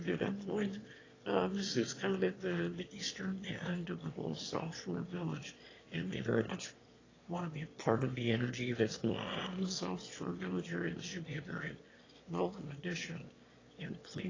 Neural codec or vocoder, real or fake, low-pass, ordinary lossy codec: autoencoder, 22.05 kHz, a latent of 192 numbers a frame, VITS, trained on one speaker; fake; 7.2 kHz; AAC, 32 kbps